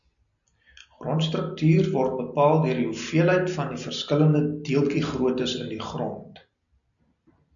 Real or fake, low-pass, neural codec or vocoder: real; 7.2 kHz; none